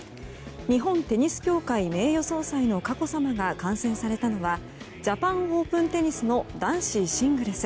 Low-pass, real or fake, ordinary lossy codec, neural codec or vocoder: none; real; none; none